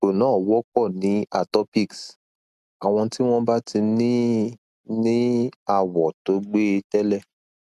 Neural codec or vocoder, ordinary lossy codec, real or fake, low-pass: none; none; real; 14.4 kHz